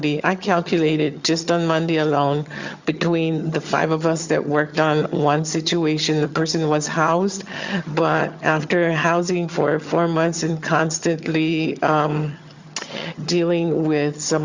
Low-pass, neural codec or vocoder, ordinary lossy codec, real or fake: 7.2 kHz; vocoder, 22.05 kHz, 80 mel bands, HiFi-GAN; Opus, 64 kbps; fake